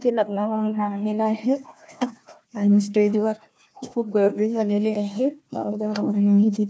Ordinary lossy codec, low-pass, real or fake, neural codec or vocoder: none; none; fake; codec, 16 kHz, 1 kbps, FunCodec, trained on Chinese and English, 50 frames a second